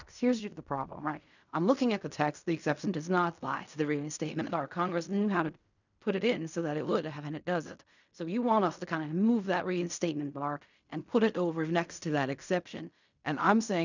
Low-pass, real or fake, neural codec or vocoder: 7.2 kHz; fake; codec, 16 kHz in and 24 kHz out, 0.4 kbps, LongCat-Audio-Codec, fine tuned four codebook decoder